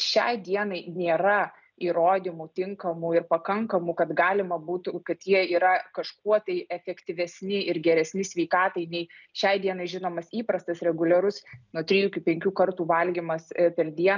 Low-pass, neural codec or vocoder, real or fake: 7.2 kHz; none; real